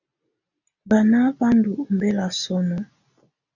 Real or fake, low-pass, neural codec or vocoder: real; 7.2 kHz; none